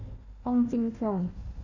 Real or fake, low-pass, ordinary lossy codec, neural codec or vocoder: fake; 7.2 kHz; Opus, 64 kbps; codec, 16 kHz, 1 kbps, FunCodec, trained on Chinese and English, 50 frames a second